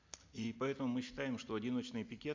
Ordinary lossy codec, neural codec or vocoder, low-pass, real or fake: MP3, 64 kbps; none; 7.2 kHz; real